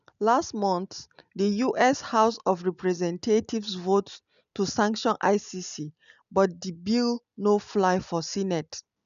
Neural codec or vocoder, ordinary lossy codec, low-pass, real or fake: none; none; 7.2 kHz; real